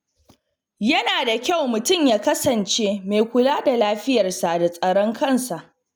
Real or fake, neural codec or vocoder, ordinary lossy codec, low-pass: real; none; none; none